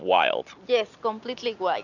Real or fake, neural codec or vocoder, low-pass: real; none; 7.2 kHz